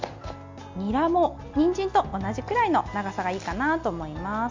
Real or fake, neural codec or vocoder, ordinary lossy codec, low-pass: real; none; MP3, 64 kbps; 7.2 kHz